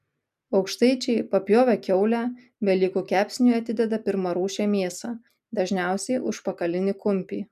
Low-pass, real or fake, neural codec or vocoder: 14.4 kHz; real; none